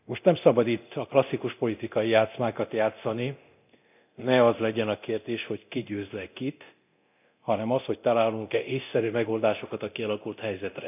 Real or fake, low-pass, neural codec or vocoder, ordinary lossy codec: fake; 3.6 kHz; codec, 24 kHz, 0.9 kbps, DualCodec; none